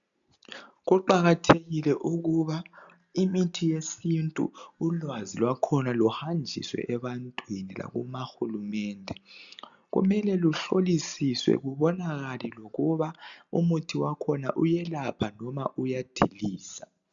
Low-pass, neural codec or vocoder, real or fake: 7.2 kHz; none; real